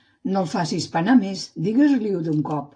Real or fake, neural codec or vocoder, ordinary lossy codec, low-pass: real; none; AAC, 48 kbps; 9.9 kHz